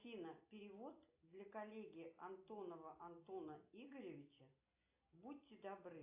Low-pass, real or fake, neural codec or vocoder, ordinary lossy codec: 3.6 kHz; real; none; AAC, 32 kbps